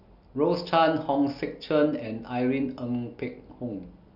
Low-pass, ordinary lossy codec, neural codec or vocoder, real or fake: 5.4 kHz; none; none; real